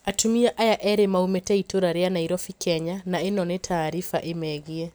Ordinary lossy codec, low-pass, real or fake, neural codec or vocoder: none; none; real; none